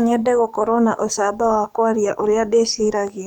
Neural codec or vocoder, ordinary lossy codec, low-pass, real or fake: codec, 44.1 kHz, 7.8 kbps, DAC; none; 19.8 kHz; fake